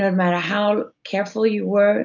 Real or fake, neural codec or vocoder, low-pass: real; none; 7.2 kHz